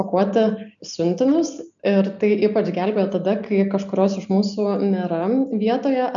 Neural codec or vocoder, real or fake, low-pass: none; real; 7.2 kHz